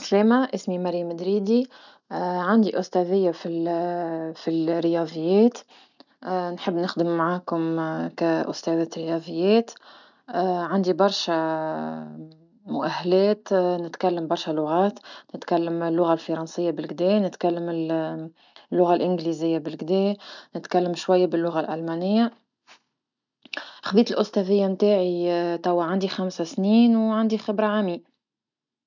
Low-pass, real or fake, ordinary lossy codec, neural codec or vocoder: 7.2 kHz; real; none; none